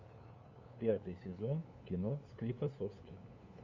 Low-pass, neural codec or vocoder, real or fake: 7.2 kHz; codec, 16 kHz, 2 kbps, FunCodec, trained on LibriTTS, 25 frames a second; fake